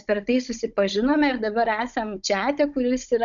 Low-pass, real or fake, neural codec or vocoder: 7.2 kHz; fake; codec, 16 kHz, 16 kbps, FunCodec, trained on Chinese and English, 50 frames a second